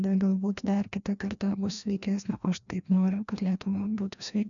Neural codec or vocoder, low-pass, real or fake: codec, 16 kHz, 1 kbps, FreqCodec, larger model; 7.2 kHz; fake